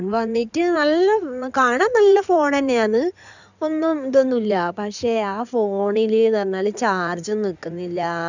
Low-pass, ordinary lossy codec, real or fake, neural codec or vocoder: 7.2 kHz; none; fake; codec, 16 kHz in and 24 kHz out, 2.2 kbps, FireRedTTS-2 codec